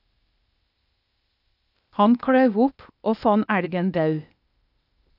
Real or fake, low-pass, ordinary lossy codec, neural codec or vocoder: fake; 5.4 kHz; none; codec, 16 kHz, 0.8 kbps, ZipCodec